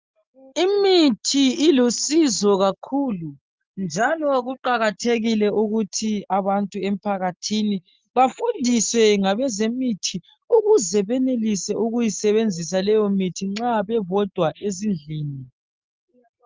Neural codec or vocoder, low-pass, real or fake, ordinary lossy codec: none; 7.2 kHz; real; Opus, 32 kbps